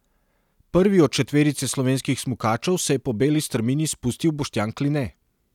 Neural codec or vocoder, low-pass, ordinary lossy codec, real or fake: none; 19.8 kHz; none; real